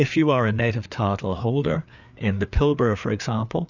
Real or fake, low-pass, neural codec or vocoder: fake; 7.2 kHz; codec, 16 kHz, 4 kbps, FreqCodec, larger model